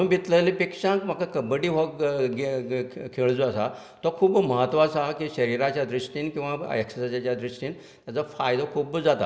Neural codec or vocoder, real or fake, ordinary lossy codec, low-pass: none; real; none; none